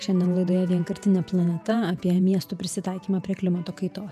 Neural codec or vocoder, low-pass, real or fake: vocoder, 48 kHz, 128 mel bands, Vocos; 14.4 kHz; fake